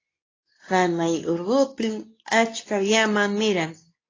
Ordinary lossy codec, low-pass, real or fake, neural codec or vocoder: AAC, 32 kbps; 7.2 kHz; fake; codec, 24 kHz, 0.9 kbps, WavTokenizer, medium speech release version 2